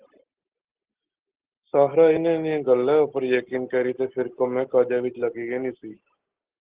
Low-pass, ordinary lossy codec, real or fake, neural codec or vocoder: 3.6 kHz; Opus, 16 kbps; real; none